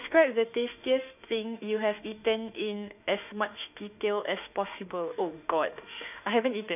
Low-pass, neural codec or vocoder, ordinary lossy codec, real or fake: 3.6 kHz; autoencoder, 48 kHz, 32 numbers a frame, DAC-VAE, trained on Japanese speech; none; fake